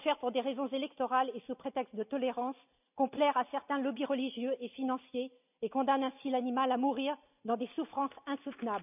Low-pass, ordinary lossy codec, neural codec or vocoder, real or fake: 3.6 kHz; none; none; real